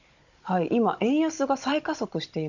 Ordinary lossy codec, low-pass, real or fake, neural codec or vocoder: none; 7.2 kHz; fake; codec, 16 kHz, 16 kbps, FunCodec, trained on Chinese and English, 50 frames a second